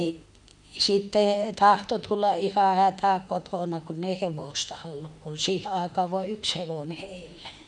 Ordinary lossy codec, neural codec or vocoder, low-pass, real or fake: none; autoencoder, 48 kHz, 32 numbers a frame, DAC-VAE, trained on Japanese speech; 10.8 kHz; fake